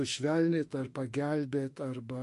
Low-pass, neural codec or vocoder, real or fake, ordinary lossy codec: 14.4 kHz; autoencoder, 48 kHz, 32 numbers a frame, DAC-VAE, trained on Japanese speech; fake; MP3, 48 kbps